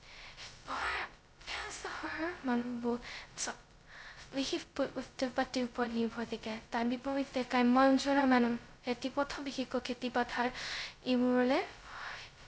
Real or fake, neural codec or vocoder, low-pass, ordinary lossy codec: fake; codec, 16 kHz, 0.2 kbps, FocalCodec; none; none